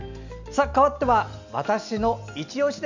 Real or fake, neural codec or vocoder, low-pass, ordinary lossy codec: real; none; 7.2 kHz; none